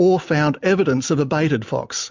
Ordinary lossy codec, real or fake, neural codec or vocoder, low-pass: MP3, 64 kbps; real; none; 7.2 kHz